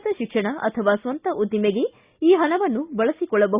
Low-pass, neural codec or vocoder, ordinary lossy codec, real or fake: 3.6 kHz; none; Opus, 64 kbps; real